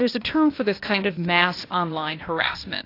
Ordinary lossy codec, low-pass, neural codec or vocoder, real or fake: AAC, 32 kbps; 5.4 kHz; codec, 16 kHz, 0.8 kbps, ZipCodec; fake